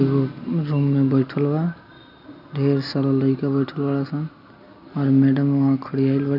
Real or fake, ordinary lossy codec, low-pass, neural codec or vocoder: real; none; 5.4 kHz; none